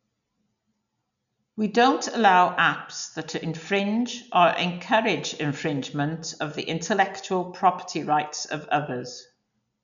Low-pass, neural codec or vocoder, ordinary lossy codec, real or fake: 7.2 kHz; none; none; real